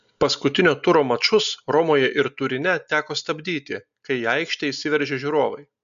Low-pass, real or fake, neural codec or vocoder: 7.2 kHz; real; none